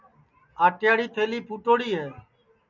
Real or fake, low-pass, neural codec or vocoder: real; 7.2 kHz; none